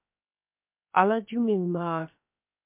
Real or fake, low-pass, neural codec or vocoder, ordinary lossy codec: fake; 3.6 kHz; codec, 16 kHz, 0.7 kbps, FocalCodec; MP3, 32 kbps